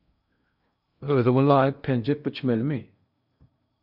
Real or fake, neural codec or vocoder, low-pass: fake; codec, 16 kHz in and 24 kHz out, 0.8 kbps, FocalCodec, streaming, 65536 codes; 5.4 kHz